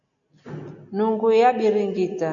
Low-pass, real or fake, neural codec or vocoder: 7.2 kHz; real; none